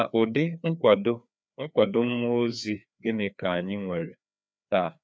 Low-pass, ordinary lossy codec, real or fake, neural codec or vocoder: none; none; fake; codec, 16 kHz, 2 kbps, FreqCodec, larger model